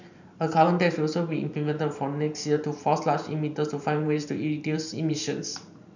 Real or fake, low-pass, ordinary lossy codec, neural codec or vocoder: fake; 7.2 kHz; none; vocoder, 44.1 kHz, 128 mel bands every 512 samples, BigVGAN v2